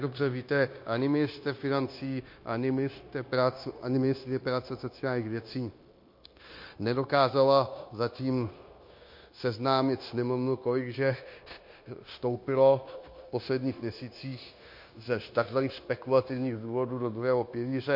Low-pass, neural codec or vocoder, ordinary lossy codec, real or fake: 5.4 kHz; codec, 16 kHz, 0.9 kbps, LongCat-Audio-Codec; MP3, 32 kbps; fake